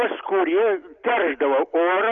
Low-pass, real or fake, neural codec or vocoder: 7.2 kHz; real; none